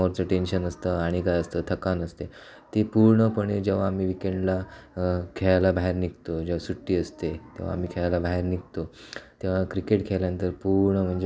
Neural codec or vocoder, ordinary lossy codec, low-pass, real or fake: none; none; none; real